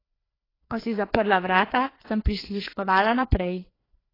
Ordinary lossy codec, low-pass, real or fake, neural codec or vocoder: AAC, 24 kbps; 5.4 kHz; fake; codec, 16 kHz, 2 kbps, FreqCodec, larger model